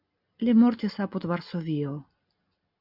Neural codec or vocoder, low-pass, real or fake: none; 5.4 kHz; real